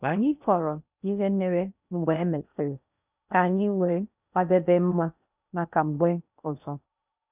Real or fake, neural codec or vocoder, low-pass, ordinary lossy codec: fake; codec, 16 kHz in and 24 kHz out, 0.6 kbps, FocalCodec, streaming, 4096 codes; 3.6 kHz; none